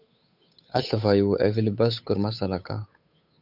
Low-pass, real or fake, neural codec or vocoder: 5.4 kHz; fake; codec, 16 kHz, 8 kbps, FunCodec, trained on Chinese and English, 25 frames a second